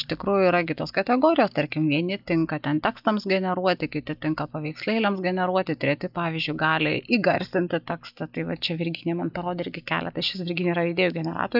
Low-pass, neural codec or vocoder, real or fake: 5.4 kHz; none; real